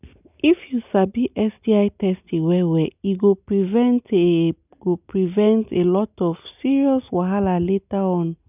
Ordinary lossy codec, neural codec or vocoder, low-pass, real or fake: none; none; 3.6 kHz; real